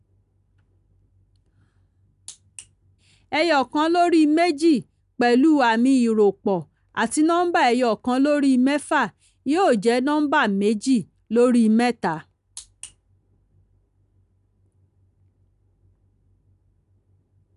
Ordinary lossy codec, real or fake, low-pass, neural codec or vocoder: none; real; 10.8 kHz; none